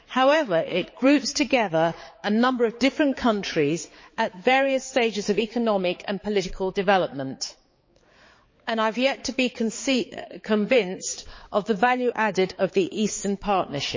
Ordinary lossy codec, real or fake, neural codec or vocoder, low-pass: MP3, 32 kbps; fake; codec, 16 kHz, 4 kbps, X-Codec, HuBERT features, trained on balanced general audio; 7.2 kHz